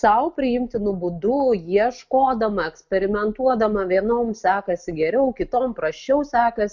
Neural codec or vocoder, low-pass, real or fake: none; 7.2 kHz; real